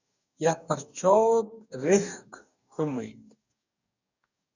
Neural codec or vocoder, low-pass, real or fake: codec, 44.1 kHz, 2.6 kbps, DAC; 7.2 kHz; fake